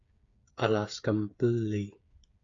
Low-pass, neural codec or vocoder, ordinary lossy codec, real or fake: 7.2 kHz; codec, 16 kHz, 8 kbps, FreqCodec, smaller model; AAC, 64 kbps; fake